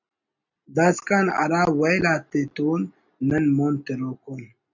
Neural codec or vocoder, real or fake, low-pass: none; real; 7.2 kHz